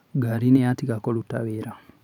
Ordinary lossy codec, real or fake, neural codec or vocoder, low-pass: none; fake; vocoder, 44.1 kHz, 128 mel bands every 512 samples, BigVGAN v2; 19.8 kHz